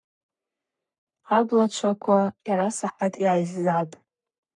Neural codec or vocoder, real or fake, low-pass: codec, 44.1 kHz, 3.4 kbps, Pupu-Codec; fake; 10.8 kHz